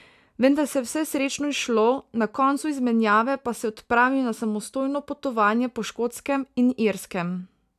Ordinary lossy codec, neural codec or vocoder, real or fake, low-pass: none; none; real; 14.4 kHz